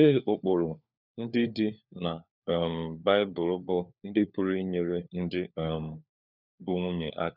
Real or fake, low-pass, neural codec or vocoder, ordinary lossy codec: fake; 5.4 kHz; codec, 16 kHz, 4 kbps, FunCodec, trained on LibriTTS, 50 frames a second; none